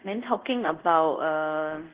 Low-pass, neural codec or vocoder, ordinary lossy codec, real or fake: 3.6 kHz; codec, 24 kHz, 0.5 kbps, DualCodec; Opus, 16 kbps; fake